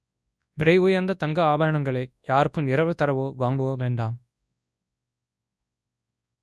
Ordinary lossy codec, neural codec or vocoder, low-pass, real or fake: none; codec, 24 kHz, 0.9 kbps, WavTokenizer, large speech release; none; fake